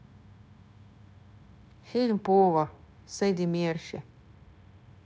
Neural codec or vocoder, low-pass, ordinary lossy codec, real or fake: codec, 16 kHz, 0.9 kbps, LongCat-Audio-Codec; none; none; fake